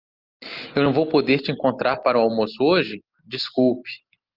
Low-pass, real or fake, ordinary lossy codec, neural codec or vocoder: 5.4 kHz; real; Opus, 24 kbps; none